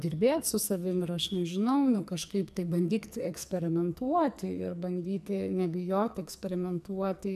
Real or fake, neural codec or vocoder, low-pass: fake; codec, 44.1 kHz, 2.6 kbps, SNAC; 14.4 kHz